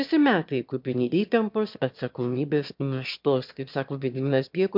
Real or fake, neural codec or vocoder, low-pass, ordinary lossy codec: fake; autoencoder, 22.05 kHz, a latent of 192 numbers a frame, VITS, trained on one speaker; 5.4 kHz; MP3, 48 kbps